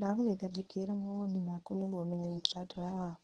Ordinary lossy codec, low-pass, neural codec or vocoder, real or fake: Opus, 16 kbps; 10.8 kHz; codec, 24 kHz, 0.9 kbps, WavTokenizer, medium speech release version 1; fake